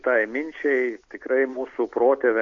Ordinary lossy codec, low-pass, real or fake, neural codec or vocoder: AAC, 48 kbps; 7.2 kHz; real; none